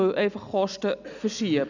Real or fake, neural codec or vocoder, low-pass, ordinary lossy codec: real; none; 7.2 kHz; none